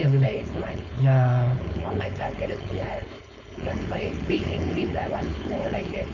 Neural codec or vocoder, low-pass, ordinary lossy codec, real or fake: codec, 16 kHz, 4.8 kbps, FACodec; 7.2 kHz; none; fake